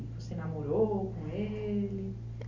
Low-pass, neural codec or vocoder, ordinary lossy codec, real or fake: 7.2 kHz; none; none; real